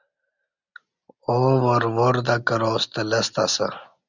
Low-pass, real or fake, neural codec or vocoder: 7.2 kHz; real; none